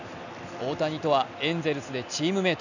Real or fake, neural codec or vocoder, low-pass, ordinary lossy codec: real; none; 7.2 kHz; none